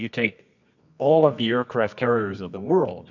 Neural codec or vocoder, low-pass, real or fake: codec, 24 kHz, 0.9 kbps, WavTokenizer, medium music audio release; 7.2 kHz; fake